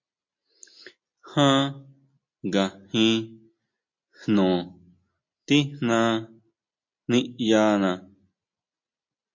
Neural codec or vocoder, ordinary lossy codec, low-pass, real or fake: none; MP3, 48 kbps; 7.2 kHz; real